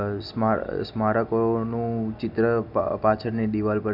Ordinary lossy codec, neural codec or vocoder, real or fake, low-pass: none; none; real; 5.4 kHz